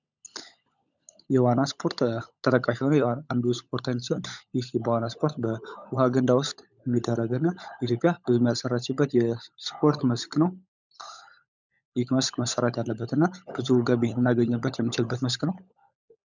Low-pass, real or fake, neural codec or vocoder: 7.2 kHz; fake; codec, 16 kHz, 16 kbps, FunCodec, trained on LibriTTS, 50 frames a second